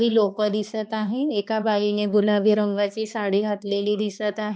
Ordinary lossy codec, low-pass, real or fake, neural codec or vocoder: none; none; fake; codec, 16 kHz, 2 kbps, X-Codec, HuBERT features, trained on balanced general audio